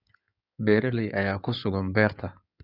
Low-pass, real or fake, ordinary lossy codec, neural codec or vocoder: 5.4 kHz; fake; none; codec, 16 kHz in and 24 kHz out, 2.2 kbps, FireRedTTS-2 codec